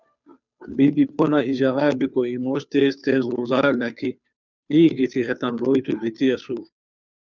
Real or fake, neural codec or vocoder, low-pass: fake; codec, 16 kHz, 2 kbps, FunCodec, trained on Chinese and English, 25 frames a second; 7.2 kHz